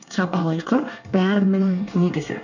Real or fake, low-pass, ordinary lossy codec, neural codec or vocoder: fake; 7.2 kHz; none; codec, 24 kHz, 1 kbps, SNAC